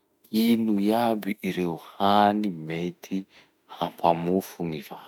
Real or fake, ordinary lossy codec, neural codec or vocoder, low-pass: fake; none; autoencoder, 48 kHz, 32 numbers a frame, DAC-VAE, trained on Japanese speech; 19.8 kHz